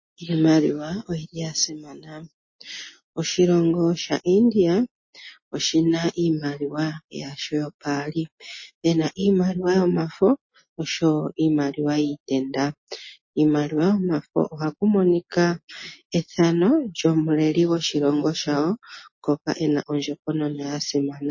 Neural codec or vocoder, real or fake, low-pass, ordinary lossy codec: none; real; 7.2 kHz; MP3, 32 kbps